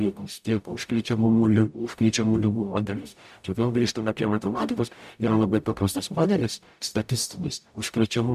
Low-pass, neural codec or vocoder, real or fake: 14.4 kHz; codec, 44.1 kHz, 0.9 kbps, DAC; fake